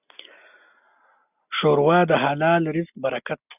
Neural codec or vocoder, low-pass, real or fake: none; 3.6 kHz; real